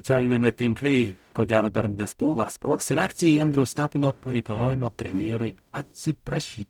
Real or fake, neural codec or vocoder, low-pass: fake; codec, 44.1 kHz, 0.9 kbps, DAC; 19.8 kHz